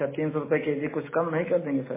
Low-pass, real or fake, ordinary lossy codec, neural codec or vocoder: 3.6 kHz; real; MP3, 16 kbps; none